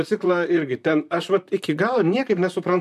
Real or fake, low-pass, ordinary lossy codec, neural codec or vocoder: fake; 14.4 kHz; MP3, 96 kbps; vocoder, 44.1 kHz, 128 mel bands, Pupu-Vocoder